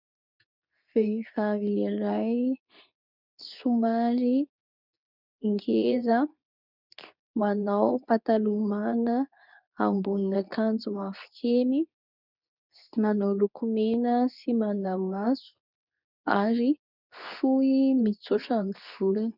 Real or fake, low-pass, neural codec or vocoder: fake; 5.4 kHz; codec, 24 kHz, 0.9 kbps, WavTokenizer, medium speech release version 2